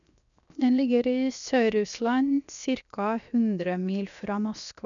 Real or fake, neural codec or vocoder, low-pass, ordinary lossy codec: fake; codec, 16 kHz, 0.7 kbps, FocalCodec; 7.2 kHz; none